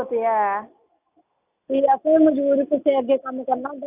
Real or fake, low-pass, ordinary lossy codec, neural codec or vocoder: real; 3.6 kHz; AAC, 32 kbps; none